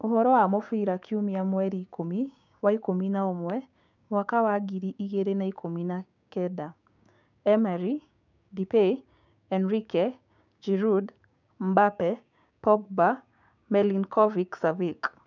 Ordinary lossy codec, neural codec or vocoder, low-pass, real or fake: none; codec, 16 kHz, 6 kbps, DAC; 7.2 kHz; fake